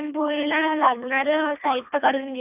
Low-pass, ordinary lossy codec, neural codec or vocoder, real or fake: 3.6 kHz; none; codec, 24 kHz, 1.5 kbps, HILCodec; fake